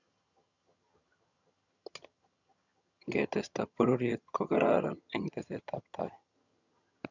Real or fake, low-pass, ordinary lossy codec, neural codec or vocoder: fake; 7.2 kHz; none; vocoder, 22.05 kHz, 80 mel bands, HiFi-GAN